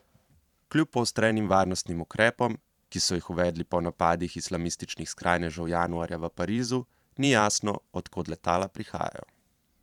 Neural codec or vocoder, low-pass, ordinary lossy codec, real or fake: vocoder, 44.1 kHz, 128 mel bands every 512 samples, BigVGAN v2; 19.8 kHz; none; fake